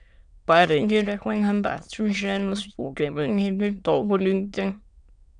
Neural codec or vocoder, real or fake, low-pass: autoencoder, 22.05 kHz, a latent of 192 numbers a frame, VITS, trained on many speakers; fake; 9.9 kHz